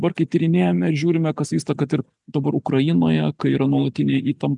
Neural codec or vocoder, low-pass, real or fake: none; 10.8 kHz; real